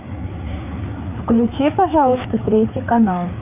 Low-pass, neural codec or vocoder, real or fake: 3.6 kHz; codec, 16 kHz, 4 kbps, FreqCodec, larger model; fake